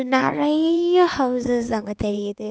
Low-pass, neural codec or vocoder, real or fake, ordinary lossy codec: none; codec, 16 kHz, 4 kbps, X-Codec, HuBERT features, trained on LibriSpeech; fake; none